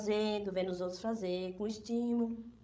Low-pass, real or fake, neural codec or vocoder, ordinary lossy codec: none; fake; codec, 16 kHz, 16 kbps, FreqCodec, larger model; none